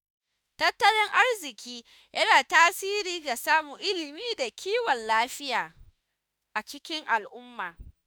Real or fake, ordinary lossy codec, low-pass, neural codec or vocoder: fake; none; none; autoencoder, 48 kHz, 32 numbers a frame, DAC-VAE, trained on Japanese speech